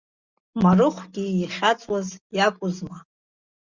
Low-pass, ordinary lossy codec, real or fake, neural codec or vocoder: 7.2 kHz; Opus, 64 kbps; real; none